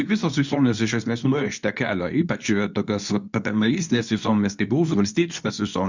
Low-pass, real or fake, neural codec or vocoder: 7.2 kHz; fake; codec, 24 kHz, 0.9 kbps, WavTokenizer, medium speech release version 2